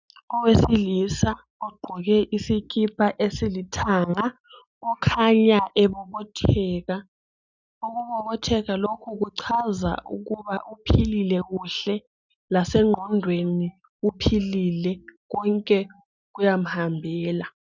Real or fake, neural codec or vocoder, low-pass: real; none; 7.2 kHz